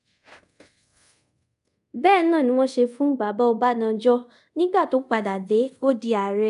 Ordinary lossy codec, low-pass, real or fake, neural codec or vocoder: none; 10.8 kHz; fake; codec, 24 kHz, 0.5 kbps, DualCodec